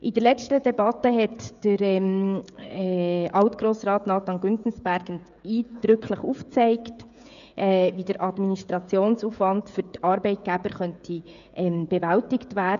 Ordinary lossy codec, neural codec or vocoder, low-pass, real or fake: none; codec, 16 kHz, 16 kbps, FreqCodec, smaller model; 7.2 kHz; fake